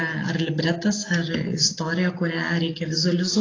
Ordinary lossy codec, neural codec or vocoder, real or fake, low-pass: AAC, 32 kbps; none; real; 7.2 kHz